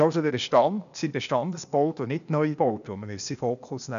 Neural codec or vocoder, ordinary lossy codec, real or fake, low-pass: codec, 16 kHz, 0.8 kbps, ZipCodec; none; fake; 7.2 kHz